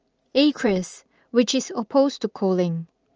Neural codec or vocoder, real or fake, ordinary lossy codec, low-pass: vocoder, 44.1 kHz, 80 mel bands, Vocos; fake; Opus, 24 kbps; 7.2 kHz